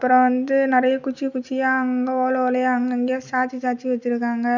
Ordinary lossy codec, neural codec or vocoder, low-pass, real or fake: none; none; 7.2 kHz; real